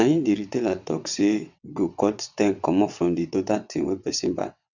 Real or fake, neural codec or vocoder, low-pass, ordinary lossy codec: fake; vocoder, 22.05 kHz, 80 mel bands, WaveNeXt; 7.2 kHz; none